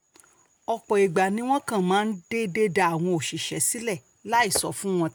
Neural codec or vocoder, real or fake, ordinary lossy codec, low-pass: none; real; none; none